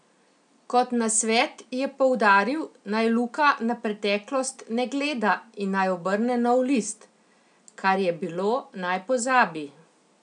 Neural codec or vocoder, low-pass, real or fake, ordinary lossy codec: none; 9.9 kHz; real; none